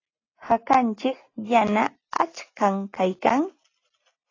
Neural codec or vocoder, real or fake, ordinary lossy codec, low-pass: none; real; AAC, 32 kbps; 7.2 kHz